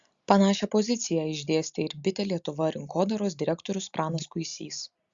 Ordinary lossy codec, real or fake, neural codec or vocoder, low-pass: Opus, 64 kbps; real; none; 7.2 kHz